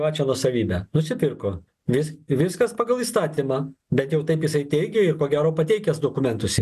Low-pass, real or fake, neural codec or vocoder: 14.4 kHz; real; none